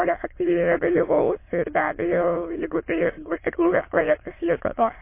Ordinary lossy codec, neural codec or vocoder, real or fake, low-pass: MP3, 24 kbps; autoencoder, 22.05 kHz, a latent of 192 numbers a frame, VITS, trained on many speakers; fake; 3.6 kHz